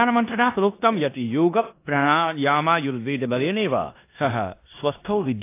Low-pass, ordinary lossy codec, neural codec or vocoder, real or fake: 3.6 kHz; AAC, 24 kbps; codec, 16 kHz in and 24 kHz out, 0.9 kbps, LongCat-Audio-Codec, four codebook decoder; fake